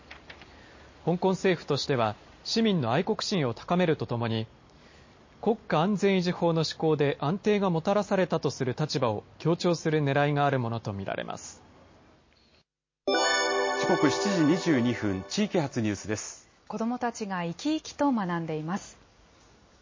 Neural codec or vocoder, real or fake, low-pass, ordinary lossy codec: none; real; 7.2 kHz; MP3, 32 kbps